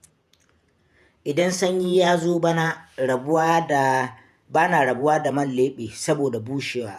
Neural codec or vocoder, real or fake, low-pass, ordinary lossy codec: vocoder, 48 kHz, 128 mel bands, Vocos; fake; 14.4 kHz; none